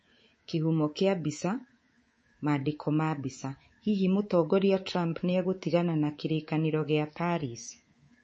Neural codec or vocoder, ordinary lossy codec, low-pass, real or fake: codec, 24 kHz, 3.1 kbps, DualCodec; MP3, 32 kbps; 9.9 kHz; fake